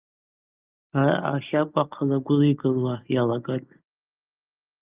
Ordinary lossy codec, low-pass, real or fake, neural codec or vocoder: Opus, 24 kbps; 3.6 kHz; real; none